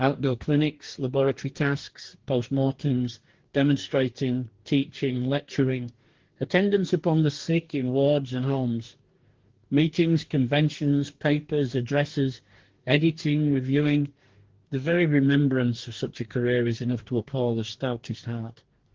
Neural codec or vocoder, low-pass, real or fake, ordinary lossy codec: codec, 44.1 kHz, 2.6 kbps, DAC; 7.2 kHz; fake; Opus, 16 kbps